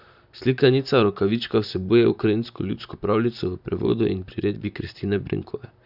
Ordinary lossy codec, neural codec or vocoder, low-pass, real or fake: none; vocoder, 44.1 kHz, 128 mel bands, Pupu-Vocoder; 5.4 kHz; fake